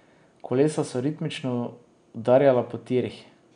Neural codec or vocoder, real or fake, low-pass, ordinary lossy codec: none; real; 9.9 kHz; none